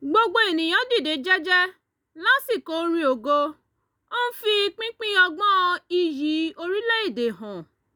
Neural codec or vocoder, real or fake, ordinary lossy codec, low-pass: none; real; none; none